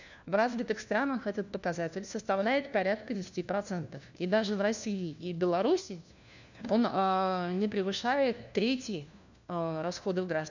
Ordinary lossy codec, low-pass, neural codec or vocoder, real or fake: none; 7.2 kHz; codec, 16 kHz, 1 kbps, FunCodec, trained on LibriTTS, 50 frames a second; fake